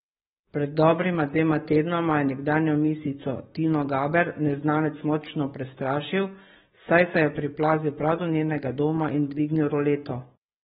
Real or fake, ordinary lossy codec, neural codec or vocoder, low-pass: fake; AAC, 16 kbps; codec, 44.1 kHz, 7.8 kbps, DAC; 19.8 kHz